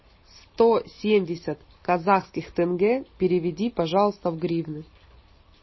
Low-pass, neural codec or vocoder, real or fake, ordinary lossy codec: 7.2 kHz; none; real; MP3, 24 kbps